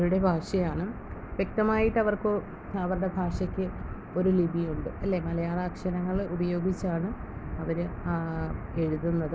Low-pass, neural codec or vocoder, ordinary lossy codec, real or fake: none; none; none; real